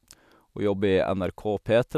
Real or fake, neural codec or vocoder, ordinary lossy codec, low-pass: real; none; none; 14.4 kHz